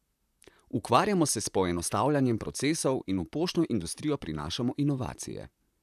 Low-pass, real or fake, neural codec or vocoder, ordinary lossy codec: 14.4 kHz; fake; vocoder, 44.1 kHz, 128 mel bands, Pupu-Vocoder; none